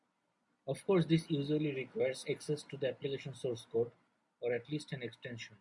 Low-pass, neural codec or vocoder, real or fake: 10.8 kHz; none; real